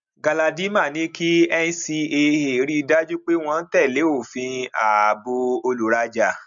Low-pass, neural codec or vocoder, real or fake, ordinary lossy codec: 7.2 kHz; none; real; MP3, 64 kbps